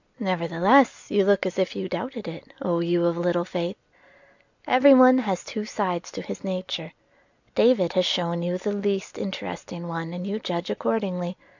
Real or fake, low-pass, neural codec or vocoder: real; 7.2 kHz; none